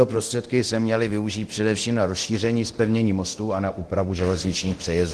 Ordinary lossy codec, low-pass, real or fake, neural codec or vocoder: Opus, 16 kbps; 10.8 kHz; fake; codec, 24 kHz, 0.9 kbps, DualCodec